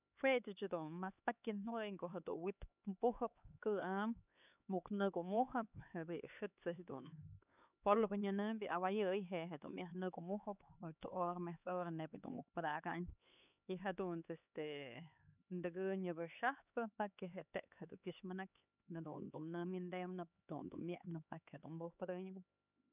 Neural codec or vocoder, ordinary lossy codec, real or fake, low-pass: codec, 16 kHz, 4 kbps, X-Codec, HuBERT features, trained on LibriSpeech; none; fake; 3.6 kHz